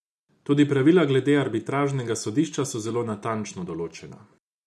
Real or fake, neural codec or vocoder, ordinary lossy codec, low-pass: real; none; none; none